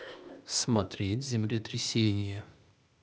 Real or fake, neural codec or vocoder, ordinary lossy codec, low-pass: fake; codec, 16 kHz, 0.8 kbps, ZipCodec; none; none